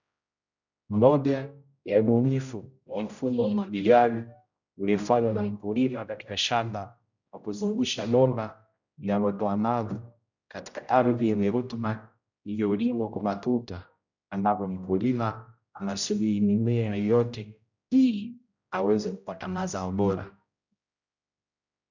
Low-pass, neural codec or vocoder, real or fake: 7.2 kHz; codec, 16 kHz, 0.5 kbps, X-Codec, HuBERT features, trained on general audio; fake